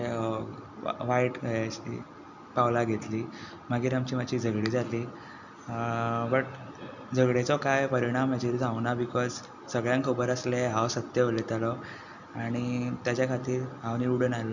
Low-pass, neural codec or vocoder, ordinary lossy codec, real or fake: 7.2 kHz; none; none; real